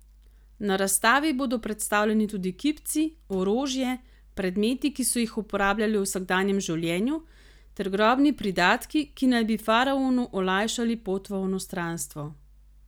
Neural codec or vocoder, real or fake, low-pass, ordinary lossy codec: none; real; none; none